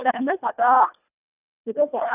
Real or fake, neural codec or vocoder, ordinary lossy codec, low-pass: fake; codec, 24 kHz, 1.5 kbps, HILCodec; none; 3.6 kHz